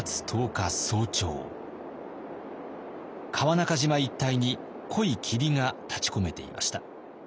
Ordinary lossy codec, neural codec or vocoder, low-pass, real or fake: none; none; none; real